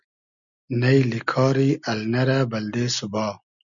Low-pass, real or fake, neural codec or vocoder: 7.2 kHz; real; none